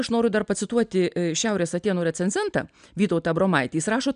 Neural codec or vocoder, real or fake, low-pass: none; real; 9.9 kHz